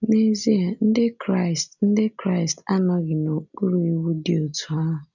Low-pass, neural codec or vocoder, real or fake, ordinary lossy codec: 7.2 kHz; none; real; none